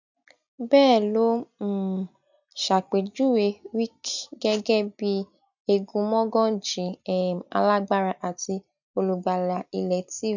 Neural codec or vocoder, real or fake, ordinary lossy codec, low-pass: none; real; none; 7.2 kHz